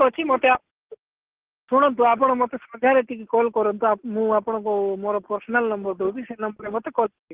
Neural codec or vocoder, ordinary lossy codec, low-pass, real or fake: none; Opus, 16 kbps; 3.6 kHz; real